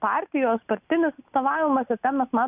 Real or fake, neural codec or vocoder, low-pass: real; none; 3.6 kHz